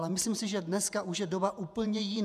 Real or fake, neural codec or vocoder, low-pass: fake; vocoder, 48 kHz, 128 mel bands, Vocos; 14.4 kHz